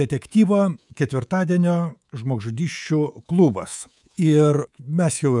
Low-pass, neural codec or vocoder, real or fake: 10.8 kHz; codec, 24 kHz, 3.1 kbps, DualCodec; fake